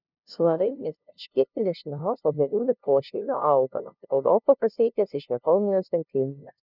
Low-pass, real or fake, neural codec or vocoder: 5.4 kHz; fake; codec, 16 kHz, 0.5 kbps, FunCodec, trained on LibriTTS, 25 frames a second